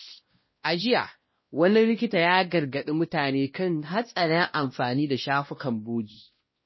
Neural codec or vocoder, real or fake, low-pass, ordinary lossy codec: codec, 16 kHz, 1 kbps, X-Codec, WavLM features, trained on Multilingual LibriSpeech; fake; 7.2 kHz; MP3, 24 kbps